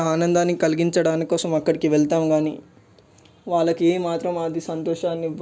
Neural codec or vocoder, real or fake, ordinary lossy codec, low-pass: none; real; none; none